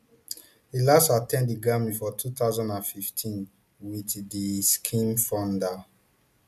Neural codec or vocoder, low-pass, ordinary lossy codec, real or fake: none; 14.4 kHz; none; real